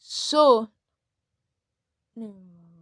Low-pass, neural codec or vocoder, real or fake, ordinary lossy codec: 9.9 kHz; none; real; AAC, 64 kbps